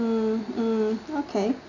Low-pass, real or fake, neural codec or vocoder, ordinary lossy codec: 7.2 kHz; real; none; none